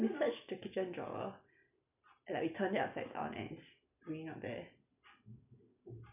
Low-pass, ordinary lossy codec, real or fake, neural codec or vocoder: 3.6 kHz; none; real; none